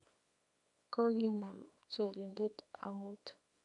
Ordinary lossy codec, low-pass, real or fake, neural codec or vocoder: none; 10.8 kHz; fake; codec, 24 kHz, 0.9 kbps, WavTokenizer, small release